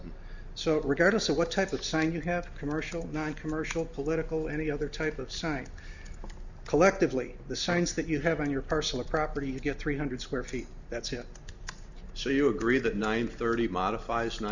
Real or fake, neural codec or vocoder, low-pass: real; none; 7.2 kHz